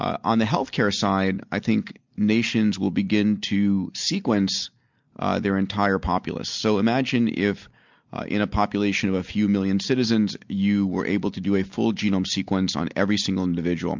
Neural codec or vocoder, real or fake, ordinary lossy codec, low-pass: none; real; MP3, 64 kbps; 7.2 kHz